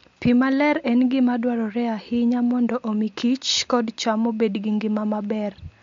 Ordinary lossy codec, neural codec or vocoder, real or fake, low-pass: MP3, 48 kbps; none; real; 7.2 kHz